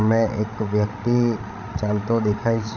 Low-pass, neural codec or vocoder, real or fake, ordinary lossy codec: 7.2 kHz; codec, 16 kHz, 16 kbps, FreqCodec, larger model; fake; none